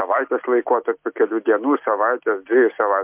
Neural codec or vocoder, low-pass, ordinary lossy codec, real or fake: none; 3.6 kHz; MP3, 32 kbps; real